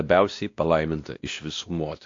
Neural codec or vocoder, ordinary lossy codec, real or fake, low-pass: codec, 16 kHz, 1 kbps, X-Codec, WavLM features, trained on Multilingual LibriSpeech; AAC, 48 kbps; fake; 7.2 kHz